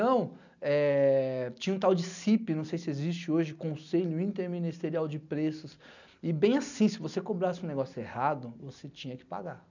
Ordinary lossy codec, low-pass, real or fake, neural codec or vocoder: none; 7.2 kHz; real; none